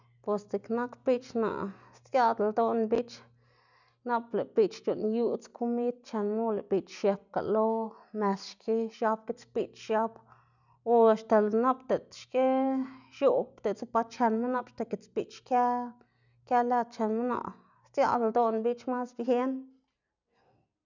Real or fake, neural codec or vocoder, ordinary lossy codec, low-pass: real; none; none; 7.2 kHz